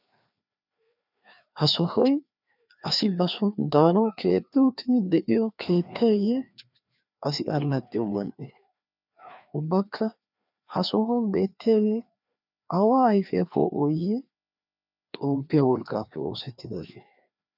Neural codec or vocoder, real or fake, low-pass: codec, 16 kHz, 2 kbps, FreqCodec, larger model; fake; 5.4 kHz